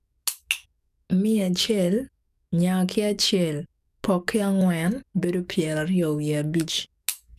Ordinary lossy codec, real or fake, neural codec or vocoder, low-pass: none; fake; codec, 44.1 kHz, 7.8 kbps, DAC; 14.4 kHz